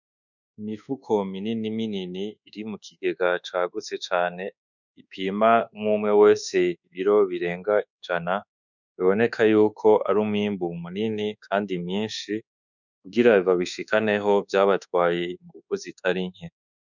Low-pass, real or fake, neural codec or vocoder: 7.2 kHz; fake; codec, 24 kHz, 1.2 kbps, DualCodec